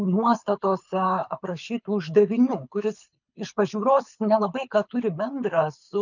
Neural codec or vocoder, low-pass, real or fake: codec, 24 kHz, 6 kbps, HILCodec; 7.2 kHz; fake